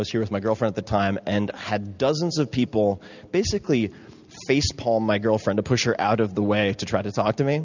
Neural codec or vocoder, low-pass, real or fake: none; 7.2 kHz; real